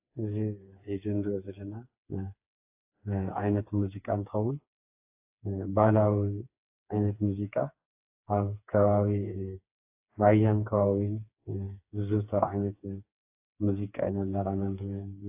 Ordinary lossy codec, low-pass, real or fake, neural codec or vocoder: AAC, 32 kbps; 3.6 kHz; fake; codec, 16 kHz, 4 kbps, FreqCodec, smaller model